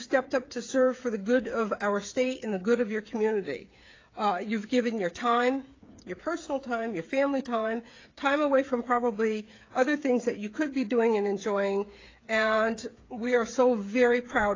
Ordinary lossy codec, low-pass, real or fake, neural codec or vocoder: AAC, 32 kbps; 7.2 kHz; fake; codec, 16 kHz, 8 kbps, FreqCodec, smaller model